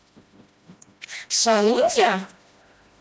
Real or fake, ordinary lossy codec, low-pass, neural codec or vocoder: fake; none; none; codec, 16 kHz, 1 kbps, FreqCodec, smaller model